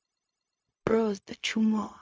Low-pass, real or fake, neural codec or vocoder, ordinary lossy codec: none; fake; codec, 16 kHz, 0.4 kbps, LongCat-Audio-Codec; none